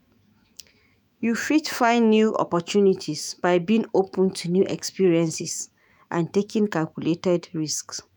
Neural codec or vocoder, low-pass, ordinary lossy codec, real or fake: autoencoder, 48 kHz, 128 numbers a frame, DAC-VAE, trained on Japanese speech; none; none; fake